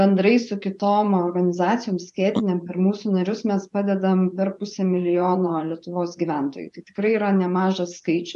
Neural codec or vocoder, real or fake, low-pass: vocoder, 24 kHz, 100 mel bands, Vocos; fake; 10.8 kHz